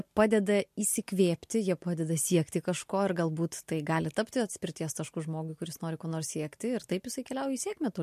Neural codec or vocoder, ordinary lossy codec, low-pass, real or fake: none; MP3, 64 kbps; 14.4 kHz; real